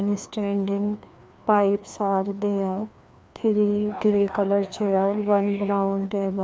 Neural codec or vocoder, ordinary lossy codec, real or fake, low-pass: codec, 16 kHz, 2 kbps, FreqCodec, larger model; none; fake; none